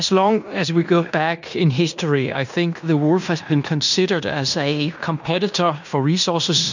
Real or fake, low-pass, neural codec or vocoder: fake; 7.2 kHz; codec, 16 kHz in and 24 kHz out, 0.9 kbps, LongCat-Audio-Codec, fine tuned four codebook decoder